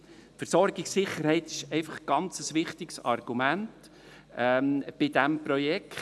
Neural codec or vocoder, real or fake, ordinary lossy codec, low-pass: none; real; none; none